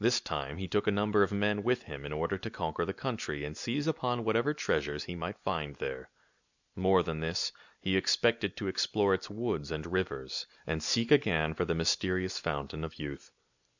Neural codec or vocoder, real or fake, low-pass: none; real; 7.2 kHz